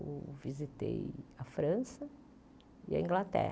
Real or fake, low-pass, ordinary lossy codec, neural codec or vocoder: real; none; none; none